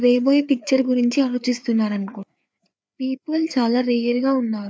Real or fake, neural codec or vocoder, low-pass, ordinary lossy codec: fake; codec, 16 kHz, 4 kbps, FreqCodec, larger model; none; none